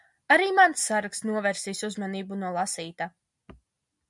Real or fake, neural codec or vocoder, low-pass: real; none; 10.8 kHz